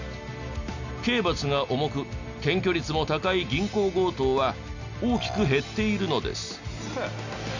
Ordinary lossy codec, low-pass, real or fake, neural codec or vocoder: none; 7.2 kHz; real; none